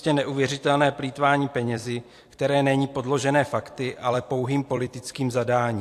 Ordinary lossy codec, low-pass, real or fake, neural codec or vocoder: AAC, 64 kbps; 14.4 kHz; fake; vocoder, 44.1 kHz, 128 mel bands every 256 samples, BigVGAN v2